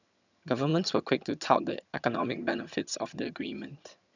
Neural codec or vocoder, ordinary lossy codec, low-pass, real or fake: vocoder, 22.05 kHz, 80 mel bands, HiFi-GAN; none; 7.2 kHz; fake